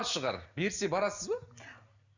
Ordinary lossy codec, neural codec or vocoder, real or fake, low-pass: none; none; real; 7.2 kHz